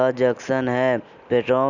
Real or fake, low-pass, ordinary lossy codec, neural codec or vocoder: real; 7.2 kHz; none; none